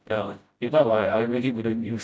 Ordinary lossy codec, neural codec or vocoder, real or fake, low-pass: none; codec, 16 kHz, 0.5 kbps, FreqCodec, smaller model; fake; none